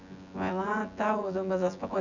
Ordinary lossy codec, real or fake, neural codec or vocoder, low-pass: none; fake; vocoder, 24 kHz, 100 mel bands, Vocos; 7.2 kHz